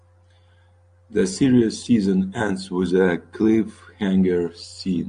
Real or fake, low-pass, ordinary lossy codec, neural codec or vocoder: real; 9.9 kHz; AAC, 64 kbps; none